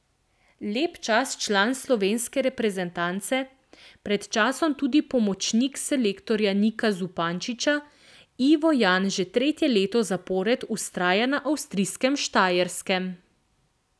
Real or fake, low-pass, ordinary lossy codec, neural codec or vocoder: real; none; none; none